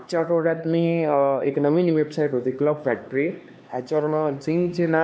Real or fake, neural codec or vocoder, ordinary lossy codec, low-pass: fake; codec, 16 kHz, 2 kbps, X-Codec, HuBERT features, trained on LibriSpeech; none; none